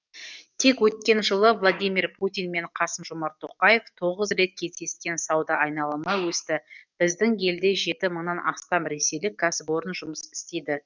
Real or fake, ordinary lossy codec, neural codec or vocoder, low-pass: fake; none; codec, 44.1 kHz, 7.8 kbps, DAC; 7.2 kHz